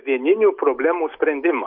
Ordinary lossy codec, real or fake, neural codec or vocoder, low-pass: MP3, 48 kbps; real; none; 5.4 kHz